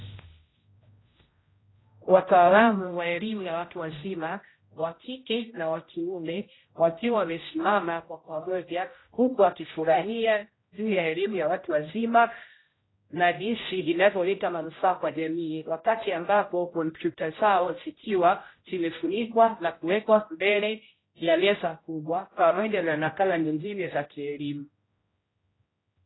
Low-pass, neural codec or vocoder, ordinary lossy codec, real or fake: 7.2 kHz; codec, 16 kHz, 0.5 kbps, X-Codec, HuBERT features, trained on general audio; AAC, 16 kbps; fake